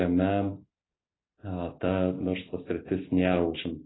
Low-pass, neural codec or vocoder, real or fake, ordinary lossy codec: 7.2 kHz; none; real; AAC, 16 kbps